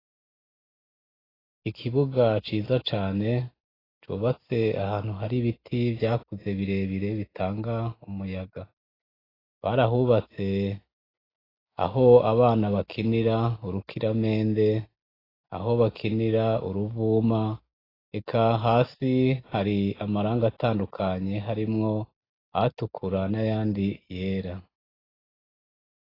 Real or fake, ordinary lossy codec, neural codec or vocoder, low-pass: real; AAC, 24 kbps; none; 5.4 kHz